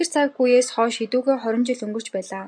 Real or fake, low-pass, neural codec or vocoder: real; 9.9 kHz; none